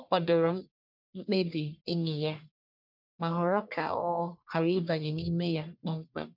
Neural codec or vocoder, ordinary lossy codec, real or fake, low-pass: codec, 44.1 kHz, 1.7 kbps, Pupu-Codec; MP3, 48 kbps; fake; 5.4 kHz